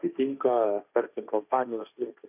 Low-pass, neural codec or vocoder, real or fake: 3.6 kHz; codec, 16 kHz, 1.1 kbps, Voila-Tokenizer; fake